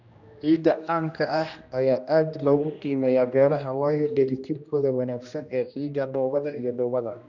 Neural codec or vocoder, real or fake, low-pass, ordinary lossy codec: codec, 16 kHz, 1 kbps, X-Codec, HuBERT features, trained on general audio; fake; 7.2 kHz; none